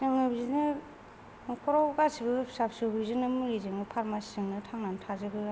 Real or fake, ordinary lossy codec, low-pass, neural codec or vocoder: real; none; none; none